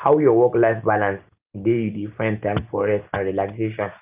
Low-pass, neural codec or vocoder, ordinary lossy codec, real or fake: 3.6 kHz; none; Opus, 24 kbps; real